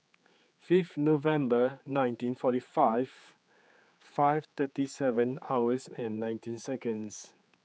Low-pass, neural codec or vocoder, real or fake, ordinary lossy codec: none; codec, 16 kHz, 4 kbps, X-Codec, HuBERT features, trained on general audio; fake; none